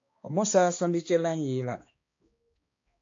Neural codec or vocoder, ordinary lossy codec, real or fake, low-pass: codec, 16 kHz, 2 kbps, X-Codec, HuBERT features, trained on balanced general audio; AAC, 48 kbps; fake; 7.2 kHz